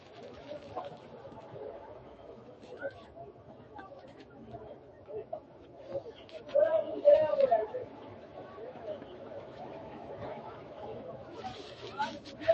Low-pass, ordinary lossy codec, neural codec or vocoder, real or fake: 10.8 kHz; MP3, 32 kbps; autoencoder, 48 kHz, 128 numbers a frame, DAC-VAE, trained on Japanese speech; fake